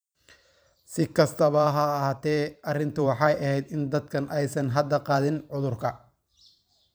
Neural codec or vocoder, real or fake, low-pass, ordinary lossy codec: vocoder, 44.1 kHz, 128 mel bands every 512 samples, BigVGAN v2; fake; none; none